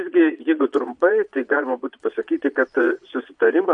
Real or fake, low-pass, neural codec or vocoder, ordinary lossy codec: fake; 9.9 kHz; vocoder, 22.05 kHz, 80 mel bands, Vocos; MP3, 64 kbps